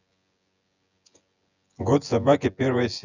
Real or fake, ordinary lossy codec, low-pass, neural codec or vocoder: fake; none; 7.2 kHz; vocoder, 24 kHz, 100 mel bands, Vocos